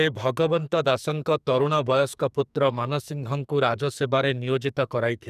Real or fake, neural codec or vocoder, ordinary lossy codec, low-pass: fake; codec, 44.1 kHz, 2.6 kbps, SNAC; none; 14.4 kHz